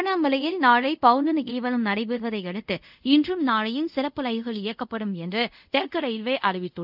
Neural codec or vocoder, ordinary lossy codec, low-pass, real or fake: codec, 24 kHz, 0.5 kbps, DualCodec; none; 5.4 kHz; fake